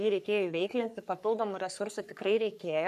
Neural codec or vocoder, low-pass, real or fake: codec, 44.1 kHz, 3.4 kbps, Pupu-Codec; 14.4 kHz; fake